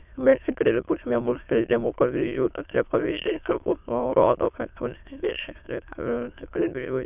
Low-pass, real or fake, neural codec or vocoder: 3.6 kHz; fake; autoencoder, 22.05 kHz, a latent of 192 numbers a frame, VITS, trained on many speakers